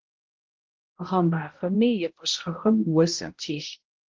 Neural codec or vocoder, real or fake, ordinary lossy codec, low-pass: codec, 16 kHz, 0.5 kbps, X-Codec, HuBERT features, trained on balanced general audio; fake; Opus, 16 kbps; 7.2 kHz